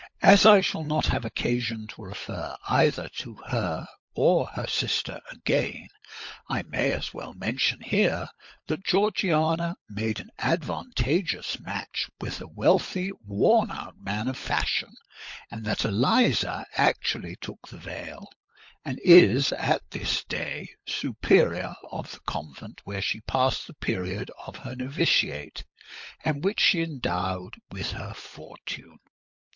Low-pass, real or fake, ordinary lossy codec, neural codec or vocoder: 7.2 kHz; fake; MP3, 48 kbps; codec, 16 kHz, 16 kbps, FunCodec, trained on LibriTTS, 50 frames a second